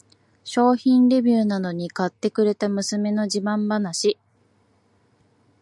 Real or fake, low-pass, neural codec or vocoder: real; 10.8 kHz; none